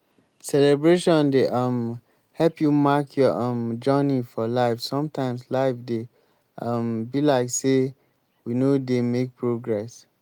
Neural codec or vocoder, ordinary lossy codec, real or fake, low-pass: none; Opus, 32 kbps; real; 19.8 kHz